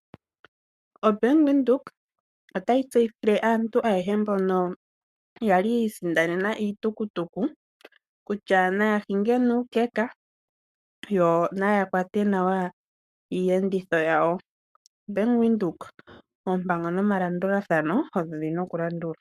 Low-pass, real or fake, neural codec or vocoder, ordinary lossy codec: 14.4 kHz; fake; codec, 44.1 kHz, 7.8 kbps, Pupu-Codec; MP3, 96 kbps